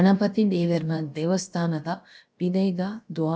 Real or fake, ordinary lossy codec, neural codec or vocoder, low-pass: fake; none; codec, 16 kHz, about 1 kbps, DyCAST, with the encoder's durations; none